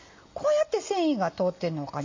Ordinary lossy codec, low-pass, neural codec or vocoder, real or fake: MP3, 48 kbps; 7.2 kHz; none; real